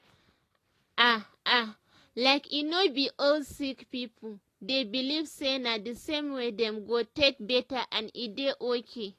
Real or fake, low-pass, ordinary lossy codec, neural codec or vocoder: real; 14.4 kHz; AAC, 48 kbps; none